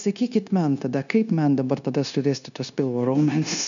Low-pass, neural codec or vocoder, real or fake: 7.2 kHz; codec, 16 kHz, 0.9 kbps, LongCat-Audio-Codec; fake